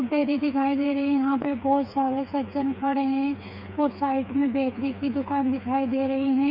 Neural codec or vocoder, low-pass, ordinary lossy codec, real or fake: codec, 16 kHz, 4 kbps, FreqCodec, smaller model; 5.4 kHz; none; fake